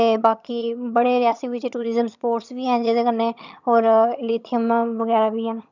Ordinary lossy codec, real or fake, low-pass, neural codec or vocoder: none; fake; 7.2 kHz; vocoder, 22.05 kHz, 80 mel bands, HiFi-GAN